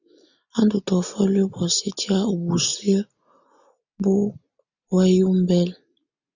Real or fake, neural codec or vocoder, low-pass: real; none; 7.2 kHz